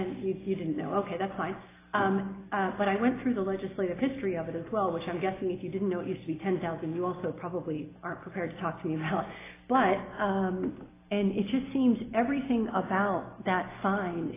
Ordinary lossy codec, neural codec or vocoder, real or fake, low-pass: AAC, 16 kbps; none; real; 3.6 kHz